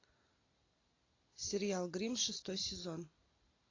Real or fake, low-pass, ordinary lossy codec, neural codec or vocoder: real; 7.2 kHz; AAC, 32 kbps; none